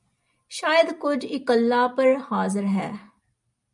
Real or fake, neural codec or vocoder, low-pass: real; none; 10.8 kHz